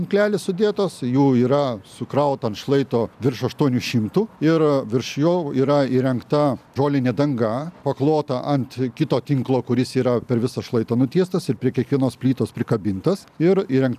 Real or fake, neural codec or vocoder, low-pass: real; none; 14.4 kHz